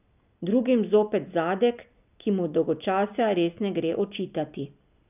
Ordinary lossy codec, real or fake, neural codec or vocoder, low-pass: none; real; none; 3.6 kHz